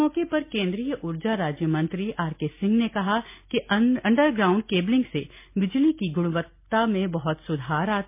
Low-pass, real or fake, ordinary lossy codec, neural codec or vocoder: 3.6 kHz; real; MP3, 24 kbps; none